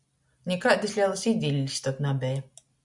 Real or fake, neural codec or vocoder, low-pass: real; none; 10.8 kHz